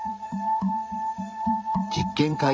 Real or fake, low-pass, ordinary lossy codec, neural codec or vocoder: fake; none; none; codec, 16 kHz, 16 kbps, FreqCodec, larger model